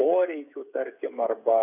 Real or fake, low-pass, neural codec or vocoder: fake; 3.6 kHz; codec, 16 kHz, 8 kbps, FreqCodec, smaller model